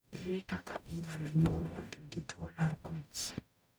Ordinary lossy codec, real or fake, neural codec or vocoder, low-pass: none; fake; codec, 44.1 kHz, 0.9 kbps, DAC; none